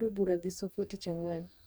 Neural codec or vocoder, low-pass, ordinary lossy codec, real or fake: codec, 44.1 kHz, 2.6 kbps, DAC; none; none; fake